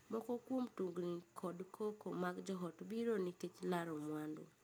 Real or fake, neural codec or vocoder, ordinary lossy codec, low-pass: real; none; none; none